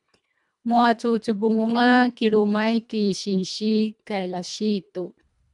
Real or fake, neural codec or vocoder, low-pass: fake; codec, 24 kHz, 1.5 kbps, HILCodec; 10.8 kHz